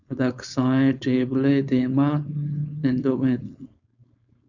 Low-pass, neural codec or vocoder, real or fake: 7.2 kHz; codec, 16 kHz, 4.8 kbps, FACodec; fake